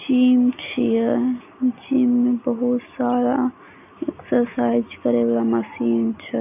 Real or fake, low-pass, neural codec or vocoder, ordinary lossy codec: real; 3.6 kHz; none; none